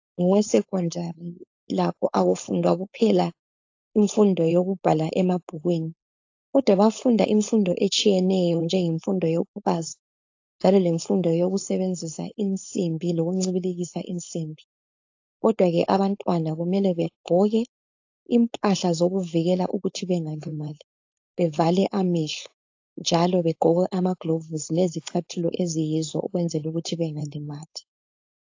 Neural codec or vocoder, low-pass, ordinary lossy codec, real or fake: codec, 16 kHz, 4.8 kbps, FACodec; 7.2 kHz; AAC, 48 kbps; fake